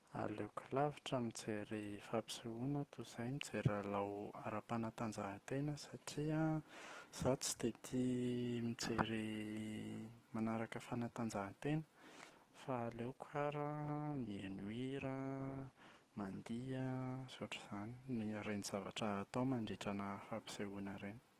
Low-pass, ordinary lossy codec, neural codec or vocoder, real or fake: 14.4 kHz; Opus, 16 kbps; autoencoder, 48 kHz, 128 numbers a frame, DAC-VAE, trained on Japanese speech; fake